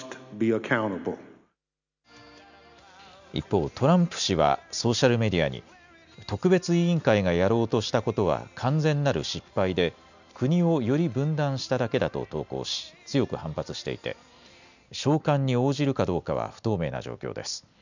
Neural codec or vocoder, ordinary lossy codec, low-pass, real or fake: none; none; 7.2 kHz; real